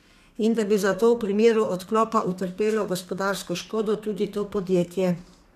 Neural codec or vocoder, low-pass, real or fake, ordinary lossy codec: codec, 32 kHz, 1.9 kbps, SNAC; 14.4 kHz; fake; none